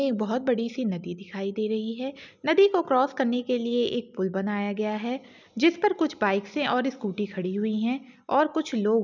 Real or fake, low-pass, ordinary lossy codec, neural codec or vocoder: real; 7.2 kHz; none; none